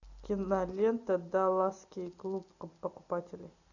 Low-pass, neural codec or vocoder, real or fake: 7.2 kHz; none; real